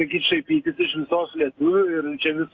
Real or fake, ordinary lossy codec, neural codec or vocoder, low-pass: real; Opus, 64 kbps; none; 7.2 kHz